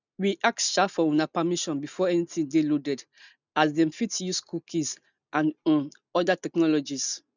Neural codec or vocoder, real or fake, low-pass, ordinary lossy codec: none; real; 7.2 kHz; none